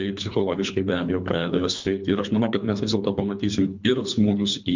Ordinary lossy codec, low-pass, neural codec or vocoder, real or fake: MP3, 64 kbps; 7.2 kHz; codec, 24 kHz, 3 kbps, HILCodec; fake